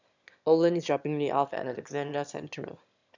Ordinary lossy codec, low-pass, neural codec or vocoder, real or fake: none; 7.2 kHz; autoencoder, 22.05 kHz, a latent of 192 numbers a frame, VITS, trained on one speaker; fake